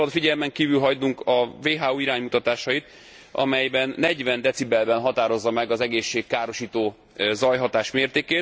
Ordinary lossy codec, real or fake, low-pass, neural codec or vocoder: none; real; none; none